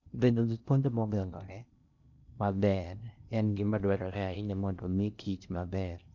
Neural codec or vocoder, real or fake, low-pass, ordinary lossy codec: codec, 16 kHz in and 24 kHz out, 0.6 kbps, FocalCodec, streaming, 4096 codes; fake; 7.2 kHz; none